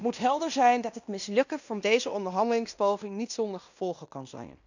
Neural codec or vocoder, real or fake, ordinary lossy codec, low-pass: codec, 16 kHz in and 24 kHz out, 0.9 kbps, LongCat-Audio-Codec, fine tuned four codebook decoder; fake; none; 7.2 kHz